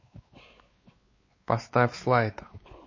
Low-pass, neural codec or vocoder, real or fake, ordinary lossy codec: 7.2 kHz; codec, 16 kHz, 4 kbps, X-Codec, WavLM features, trained on Multilingual LibriSpeech; fake; MP3, 32 kbps